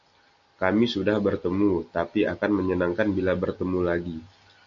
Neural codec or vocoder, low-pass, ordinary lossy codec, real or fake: none; 7.2 kHz; MP3, 48 kbps; real